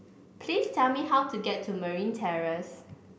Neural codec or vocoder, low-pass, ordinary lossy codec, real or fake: none; none; none; real